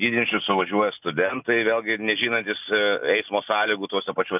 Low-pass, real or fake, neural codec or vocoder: 3.6 kHz; real; none